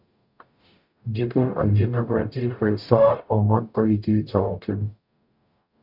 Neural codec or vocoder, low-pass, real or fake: codec, 44.1 kHz, 0.9 kbps, DAC; 5.4 kHz; fake